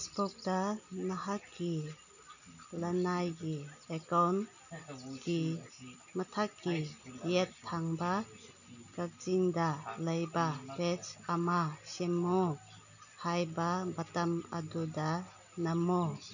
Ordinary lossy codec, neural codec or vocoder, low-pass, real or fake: none; none; 7.2 kHz; real